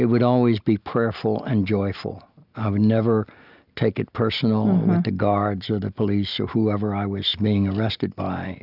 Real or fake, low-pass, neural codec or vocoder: real; 5.4 kHz; none